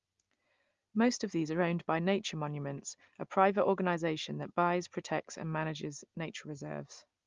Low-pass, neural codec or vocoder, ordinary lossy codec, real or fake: 7.2 kHz; none; Opus, 32 kbps; real